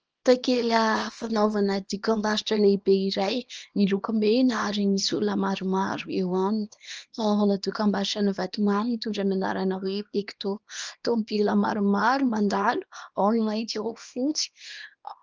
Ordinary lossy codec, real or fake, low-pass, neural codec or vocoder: Opus, 24 kbps; fake; 7.2 kHz; codec, 24 kHz, 0.9 kbps, WavTokenizer, small release